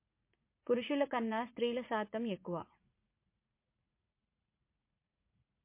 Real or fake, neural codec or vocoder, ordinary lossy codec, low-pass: fake; vocoder, 44.1 kHz, 80 mel bands, Vocos; MP3, 24 kbps; 3.6 kHz